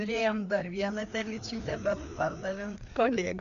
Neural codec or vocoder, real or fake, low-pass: codec, 16 kHz, 2 kbps, FreqCodec, larger model; fake; 7.2 kHz